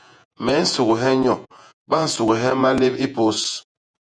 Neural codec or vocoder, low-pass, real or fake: vocoder, 48 kHz, 128 mel bands, Vocos; 9.9 kHz; fake